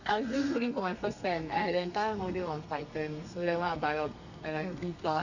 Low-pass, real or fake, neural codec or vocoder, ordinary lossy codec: 7.2 kHz; fake; codec, 32 kHz, 1.9 kbps, SNAC; none